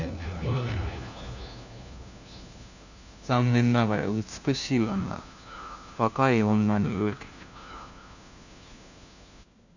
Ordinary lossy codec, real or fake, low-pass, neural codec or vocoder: none; fake; 7.2 kHz; codec, 16 kHz, 1 kbps, FunCodec, trained on LibriTTS, 50 frames a second